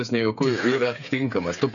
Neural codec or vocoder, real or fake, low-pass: codec, 16 kHz, 8 kbps, FreqCodec, smaller model; fake; 7.2 kHz